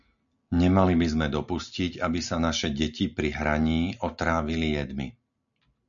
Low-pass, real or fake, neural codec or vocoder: 7.2 kHz; real; none